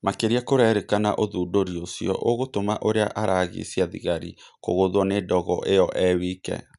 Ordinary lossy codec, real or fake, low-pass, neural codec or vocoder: none; real; 10.8 kHz; none